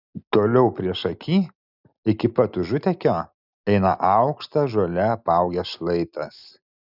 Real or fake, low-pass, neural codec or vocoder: real; 5.4 kHz; none